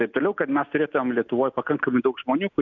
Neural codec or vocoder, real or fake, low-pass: none; real; 7.2 kHz